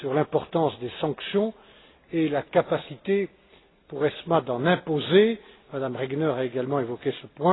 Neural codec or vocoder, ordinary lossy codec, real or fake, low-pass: none; AAC, 16 kbps; real; 7.2 kHz